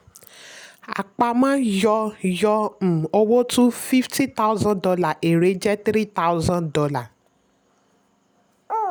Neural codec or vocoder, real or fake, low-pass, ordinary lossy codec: none; real; none; none